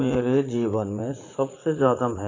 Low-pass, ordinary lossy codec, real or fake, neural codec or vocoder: 7.2 kHz; AAC, 32 kbps; fake; vocoder, 44.1 kHz, 80 mel bands, Vocos